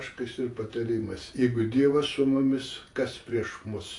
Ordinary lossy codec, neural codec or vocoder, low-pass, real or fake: AAC, 48 kbps; vocoder, 48 kHz, 128 mel bands, Vocos; 10.8 kHz; fake